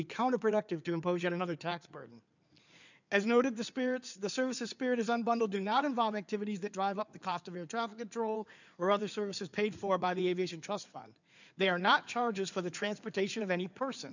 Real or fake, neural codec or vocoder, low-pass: fake; codec, 16 kHz in and 24 kHz out, 2.2 kbps, FireRedTTS-2 codec; 7.2 kHz